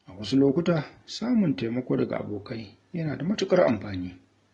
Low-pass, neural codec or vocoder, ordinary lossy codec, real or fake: 10.8 kHz; none; AAC, 32 kbps; real